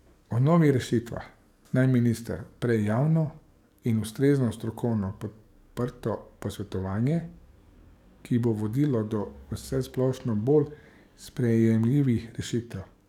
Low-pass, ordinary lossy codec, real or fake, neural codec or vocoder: 19.8 kHz; none; fake; codec, 44.1 kHz, 7.8 kbps, DAC